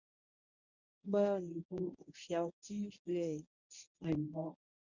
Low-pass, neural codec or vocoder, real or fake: 7.2 kHz; codec, 24 kHz, 0.9 kbps, WavTokenizer, medium speech release version 1; fake